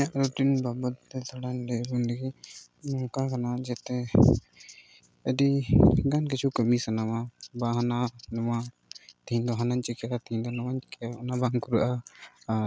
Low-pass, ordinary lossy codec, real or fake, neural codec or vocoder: none; none; real; none